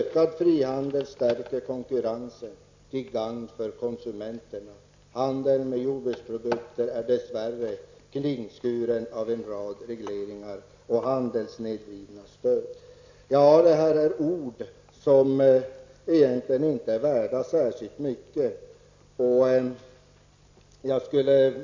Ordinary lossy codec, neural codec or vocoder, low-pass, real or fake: AAC, 48 kbps; none; 7.2 kHz; real